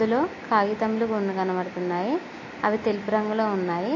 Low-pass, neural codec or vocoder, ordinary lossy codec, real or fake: 7.2 kHz; none; MP3, 32 kbps; real